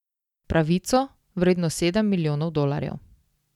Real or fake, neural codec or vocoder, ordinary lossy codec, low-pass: real; none; none; 19.8 kHz